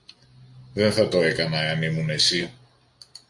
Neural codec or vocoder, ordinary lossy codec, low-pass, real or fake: none; MP3, 64 kbps; 10.8 kHz; real